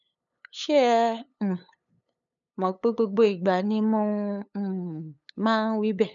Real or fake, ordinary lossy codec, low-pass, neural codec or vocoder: fake; none; 7.2 kHz; codec, 16 kHz, 8 kbps, FunCodec, trained on LibriTTS, 25 frames a second